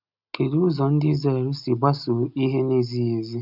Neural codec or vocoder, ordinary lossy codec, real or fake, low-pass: none; none; real; 5.4 kHz